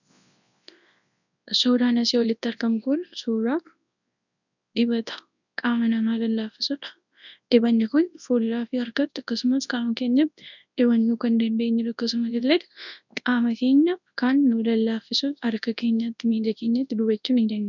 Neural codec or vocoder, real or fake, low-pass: codec, 24 kHz, 0.9 kbps, WavTokenizer, large speech release; fake; 7.2 kHz